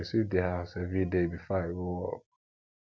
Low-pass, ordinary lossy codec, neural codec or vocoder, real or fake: none; none; none; real